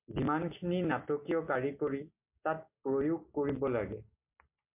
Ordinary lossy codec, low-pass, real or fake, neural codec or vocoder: MP3, 32 kbps; 3.6 kHz; real; none